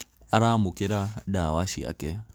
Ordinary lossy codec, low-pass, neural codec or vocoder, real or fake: none; none; codec, 44.1 kHz, 7.8 kbps, DAC; fake